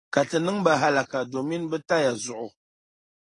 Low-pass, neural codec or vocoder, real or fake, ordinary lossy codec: 10.8 kHz; none; real; AAC, 32 kbps